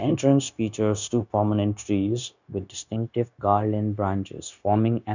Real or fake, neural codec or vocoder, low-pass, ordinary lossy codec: fake; codec, 16 kHz, 0.9 kbps, LongCat-Audio-Codec; 7.2 kHz; none